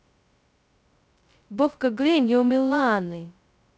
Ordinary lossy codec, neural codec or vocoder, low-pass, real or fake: none; codec, 16 kHz, 0.2 kbps, FocalCodec; none; fake